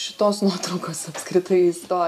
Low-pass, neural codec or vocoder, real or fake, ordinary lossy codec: 14.4 kHz; none; real; MP3, 96 kbps